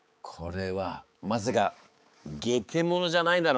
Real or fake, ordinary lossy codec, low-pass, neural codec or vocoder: fake; none; none; codec, 16 kHz, 4 kbps, X-Codec, HuBERT features, trained on balanced general audio